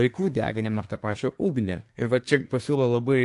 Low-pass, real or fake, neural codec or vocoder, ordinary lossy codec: 10.8 kHz; fake; codec, 24 kHz, 1 kbps, SNAC; AAC, 64 kbps